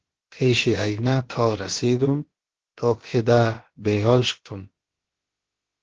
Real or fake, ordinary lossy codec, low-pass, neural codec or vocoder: fake; Opus, 16 kbps; 7.2 kHz; codec, 16 kHz, about 1 kbps, DyCAST, with the encoder's durations